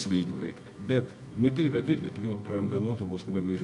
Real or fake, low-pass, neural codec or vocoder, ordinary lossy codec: fake; 10.8 kHz; codec, 24 kHz, 0.9 kbps, WavTokenizer, medium music audio release; MP3, 96 kbps